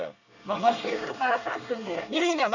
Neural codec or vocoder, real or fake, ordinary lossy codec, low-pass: codec, 24 kHz, 1 kbps, SNAC; fake; none; 7.2 kHz